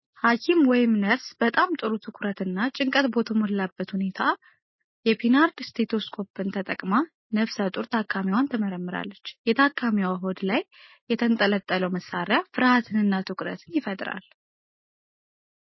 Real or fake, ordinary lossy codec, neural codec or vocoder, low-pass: real; MP3, 24 kbps; none; 7.2 kHz